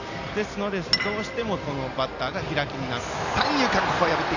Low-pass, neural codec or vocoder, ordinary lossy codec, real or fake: 7.2 kHz; none; none; real